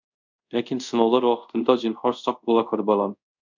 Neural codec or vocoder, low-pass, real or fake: codec, 24 kHz, 0.5 kbps, DualCodec; 7.2 kHz; fake